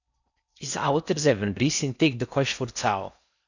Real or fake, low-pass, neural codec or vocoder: fake; 7.2 kHz; codec, 16 kHz in and 24 kHz out, 0.6 kbps, FocalCodec, streaming, 4096 codes